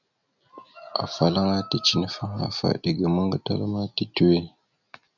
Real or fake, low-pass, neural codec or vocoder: real; 7.2 kHz; none